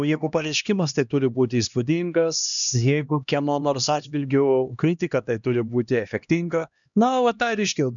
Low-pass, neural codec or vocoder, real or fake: 7.2 kHz; codec, 16 kHz, 1 kbps, X-Codec, HuBERT features, trained on LibriSpeech; fake